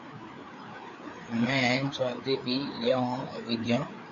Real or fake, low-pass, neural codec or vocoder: fake; 7.2 kHz; codec, 16 kHz, 4 kbps, FreqCodec, larger model